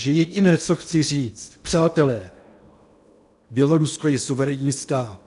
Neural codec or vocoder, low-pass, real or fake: codec, 16 kHz in and 24 kHz out, 0.8 kbps, FocalCodec, streaming, 65536 codes; 10.8 kHz; fake